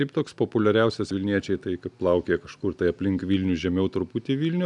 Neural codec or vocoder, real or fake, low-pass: none; real; 10.8 kHz